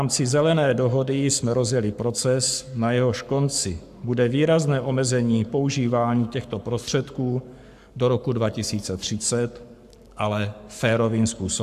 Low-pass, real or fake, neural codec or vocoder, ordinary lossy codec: 14.4 kHz; fake; codec, 44.1 kHz, 7.8 kbps, Pupu-Codec; AAC, 96 kbps